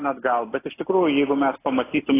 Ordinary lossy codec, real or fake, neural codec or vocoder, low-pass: AAC, 16 kbps; real; none; 3.6 kHz